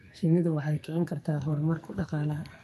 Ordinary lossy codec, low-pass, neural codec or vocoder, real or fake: MP3, 64 kbps; 14.4 kHz; codec, 44.1 kHz, 2.6 kbps, SNAC; fake